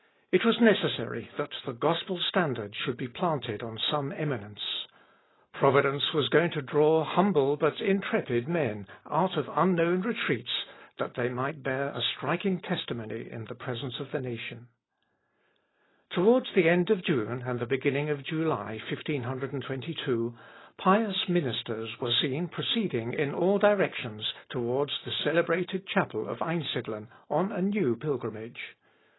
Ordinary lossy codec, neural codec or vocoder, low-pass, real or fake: AAC, 16 kbps; none; 7.2 kHz; real